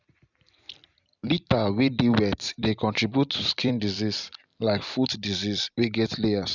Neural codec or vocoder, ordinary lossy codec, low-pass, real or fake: vocoder, 44.1 kHz, 128 mel bands every 256 samples, BigVGAN v2; none; 7.2 kHz; fake